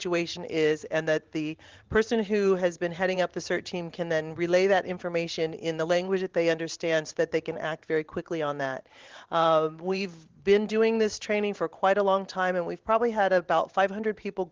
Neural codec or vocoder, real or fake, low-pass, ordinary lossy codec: vocoder, 44.1 kHz, 128 mel bands every 512 samples, BigVGAN v2; fake; 7.2 kHz; Opus, 16 kbps